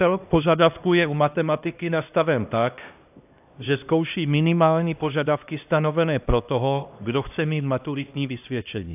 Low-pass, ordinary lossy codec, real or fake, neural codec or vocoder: 3.6 kHz; AAC, 32 kbps; fake; codec, 16 kHz, 1 kbps, X-Codec, HuBERT features, trained on LibriSpeech